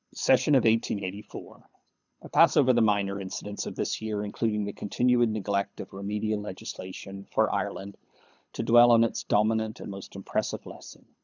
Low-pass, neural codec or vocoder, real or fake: 7.2 kHz; codec, 24 kHz, 6 kbps, HILCodec; fake